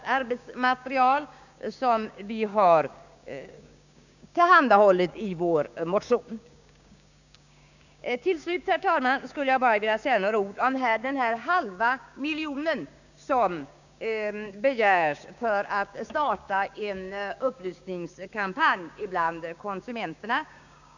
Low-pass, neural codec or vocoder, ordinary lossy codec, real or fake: 7.2 kHz; codec, 16 kHz, 6 kbps, DAC; none; fake